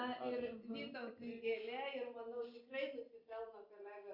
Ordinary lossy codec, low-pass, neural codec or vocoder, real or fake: Opus, 64 kbps; 5.4 kHz; none; real